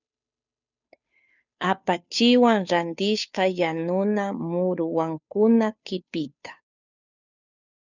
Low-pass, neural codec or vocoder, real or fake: 7.2 kHz; codec, 16 kHz, 2 kbps, FunCodec, trained on Chinese and English, 25 frames a second; fake